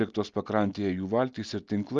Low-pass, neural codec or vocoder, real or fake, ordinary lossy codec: 7.2 kHz; none; real; Opus, 32 kbps